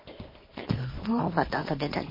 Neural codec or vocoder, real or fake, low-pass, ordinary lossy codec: codec, 24 kHz, 0.9 kbps, WavTokenizer, small release; fake; 5.4 kHz; MP3, 24 kbps